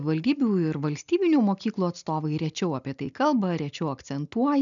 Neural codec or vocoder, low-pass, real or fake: none; 7.2 kHz; real